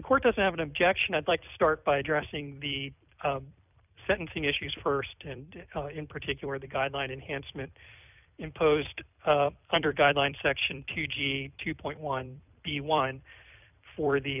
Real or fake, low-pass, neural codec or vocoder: fake; 3.6 kHz; vocoder, 44.1 kHz, 128 mel bands every 256 samples, BigVGAN v2